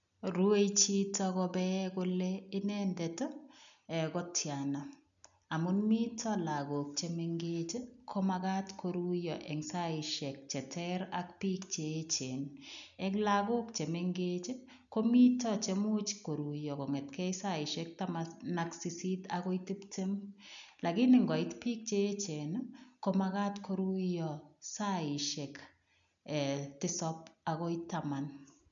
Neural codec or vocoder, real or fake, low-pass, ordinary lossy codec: none; real; 7.2 kHz; none